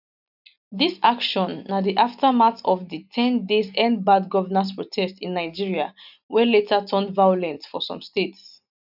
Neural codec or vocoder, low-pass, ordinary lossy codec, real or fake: none; 5.4 kHz; none; real